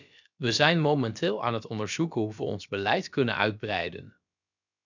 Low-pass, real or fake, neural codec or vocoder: 7.2 kHz; fake; codec, 16 kHz, about 1 kbps, DyCAST, with the encoder's durations